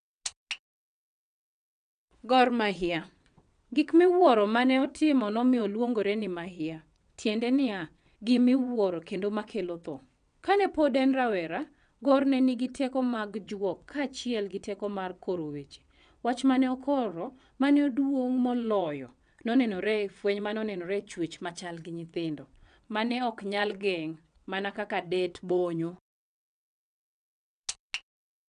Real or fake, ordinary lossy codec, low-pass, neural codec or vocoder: fake; none; 9.9 kHz; vocoder, 22.05 kHz, 80 mel bands, WaveNeXt